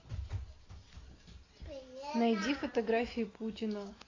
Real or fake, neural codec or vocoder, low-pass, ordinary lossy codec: real; none; 7.2 kHz; AAC, 32 kbps